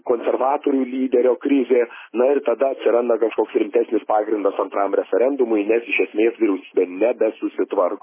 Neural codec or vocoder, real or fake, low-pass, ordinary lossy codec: none; real; 3.6 kHz; MP3, 16 kbps